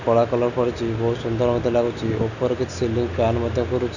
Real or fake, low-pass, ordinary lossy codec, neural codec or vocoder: real; 7.2 kHz; none; none